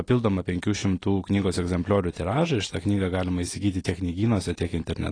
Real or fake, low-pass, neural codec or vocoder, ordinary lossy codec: real; 9.9 kHz; none; AAC, 32 kbps